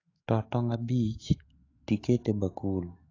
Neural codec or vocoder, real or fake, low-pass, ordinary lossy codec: autoencoder, 48 kHz, 128 numbers a frame, DAC-VAE, trained on Japanese speech; fake; 7.2 kHz; AAC, 32 kbps